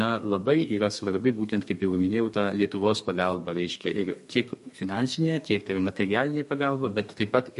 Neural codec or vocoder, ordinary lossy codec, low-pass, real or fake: codec, 32 kHz, 1.9 kbps, SNAC; MP3, 48 kbps; 14.4 kHz; fake